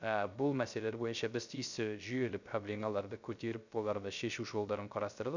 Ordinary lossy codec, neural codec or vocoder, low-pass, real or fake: none; codec, 16 kHz, 0.3 kbps, FocalCodec; 7.2 kHz; fake